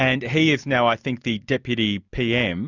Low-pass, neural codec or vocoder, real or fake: 7.2 kHz; none; real